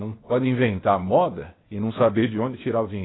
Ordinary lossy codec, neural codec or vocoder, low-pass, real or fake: AAC, 16 kbps; codec, 16 kHz, 0.8 kbps, ZipCodec; 7.2 kHz; fake